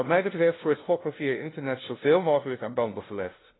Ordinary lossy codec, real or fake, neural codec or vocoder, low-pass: AAC, 16 kbps; fake; codec, 16 kHz, 0.5 kbps, FunCodec, trained on LibriTTS, 25 frames a second; 7.2 kHz